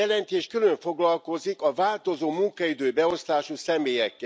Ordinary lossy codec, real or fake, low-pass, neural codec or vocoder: none; real; none; none